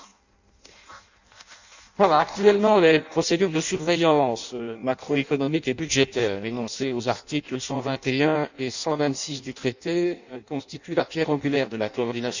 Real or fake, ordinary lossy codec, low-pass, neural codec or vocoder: fake; none; 7.2 kHz; codec, 16 kHz in and 24 kHz out, 0.6 kbps, FireRedTTS-2 codec